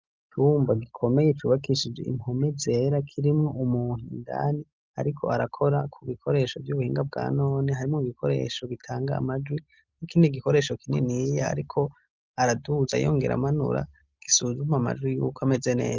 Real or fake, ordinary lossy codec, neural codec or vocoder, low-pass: real; Opus, 24 kbps; none; 7.2 kHz